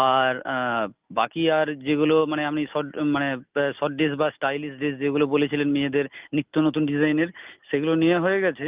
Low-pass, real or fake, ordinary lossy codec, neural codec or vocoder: 3.6 kHz; real; Opus, 32 kbps; none